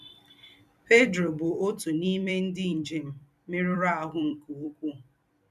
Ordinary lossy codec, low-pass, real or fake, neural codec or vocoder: none; 14.4 kHz; fake; vocoder, 44.1 kHz, 128 mel bands every 256 samples, BigVGAN v2